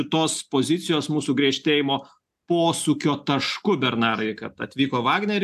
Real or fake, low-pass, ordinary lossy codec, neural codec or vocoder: real; 14.4 kHz; AAC, 96 kbps; none